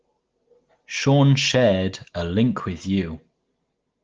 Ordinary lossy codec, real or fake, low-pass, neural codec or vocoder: Opus, 16 kbps; real; 7.2 kHz; none